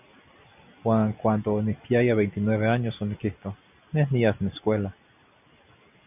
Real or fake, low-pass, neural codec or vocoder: real; 3.6 kHz; none